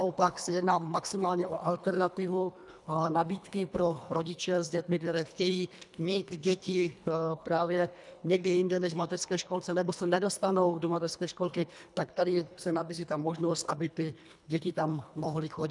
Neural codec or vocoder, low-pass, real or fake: codec, 24 kHz, 1.5 kbps, HILCodec; 10.8 kHz; fake